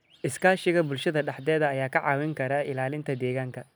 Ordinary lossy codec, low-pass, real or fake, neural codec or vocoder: none; none; real; none